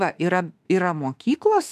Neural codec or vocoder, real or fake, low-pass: autoencoder, 48 kHz, 32 numbers a frame, DAC-VAE, trained on Japanese speech; fake; 14.4 kHz